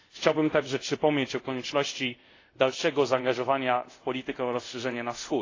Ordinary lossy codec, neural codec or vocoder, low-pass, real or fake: AAC, 32 kbps; codec, 24 kHz, 0.5 kbps, DualCodec; 7.2 kHz; fake